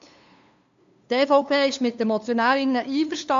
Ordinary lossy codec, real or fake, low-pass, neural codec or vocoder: AAC, 64 kbps; fake; 7.2 kHz; codec, 16 kHz, 2 kbps, FunCodec, trained on LibriTTS, 25 frames a second